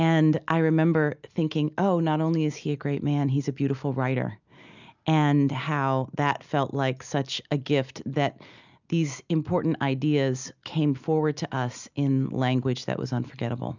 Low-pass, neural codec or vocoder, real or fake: 7.2 kHz; none; real